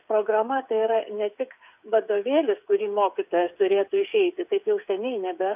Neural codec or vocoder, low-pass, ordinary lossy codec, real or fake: codec, 16 kHz, 8 kbps, FreqCodec, smaller model; 3.6 kHz; AAC, 32 kbps; fake